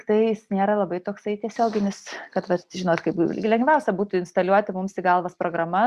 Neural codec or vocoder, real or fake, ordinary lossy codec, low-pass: none; real; Opus, 64 kbps; 14.4 kHz